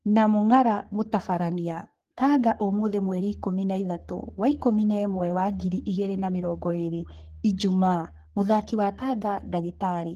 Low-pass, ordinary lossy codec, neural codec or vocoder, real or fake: 14.4 kHz; Opus, 16 kbps; codec, 44.1 kHz, 3.4 kbps, Pupu-Codec; fake